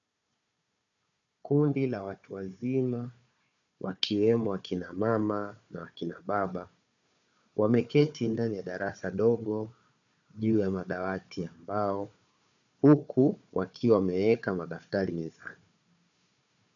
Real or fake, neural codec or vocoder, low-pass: fake; codec, 16 kHz, 4 kbps, FunCodec, trained on Chinese and English, 50 frames a second; 7.2 kHz